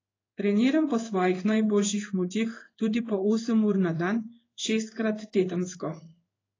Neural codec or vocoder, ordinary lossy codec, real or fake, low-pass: codec, 16 kHz in and 24 kHz out, 1 kbps, XY-Tokenizer; AAC, 32 kbps; fake; 7.2 kHz